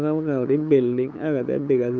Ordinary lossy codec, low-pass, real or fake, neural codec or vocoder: none; none; fake; codec, 16 kHz, 8 kbps, FunCodec, trained on LibriTTS, 25 frames a second